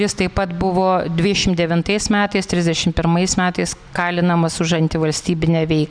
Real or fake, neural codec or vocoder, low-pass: real; none; 9.9 kHz